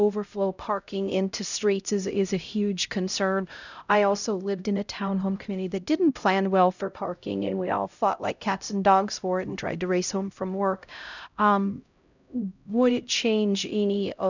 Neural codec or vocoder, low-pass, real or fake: codec, 16 kHz, 0.5 kbps, X-Codec, HuBERT features, trained on LibriSpeech; 7.2 kHz; fake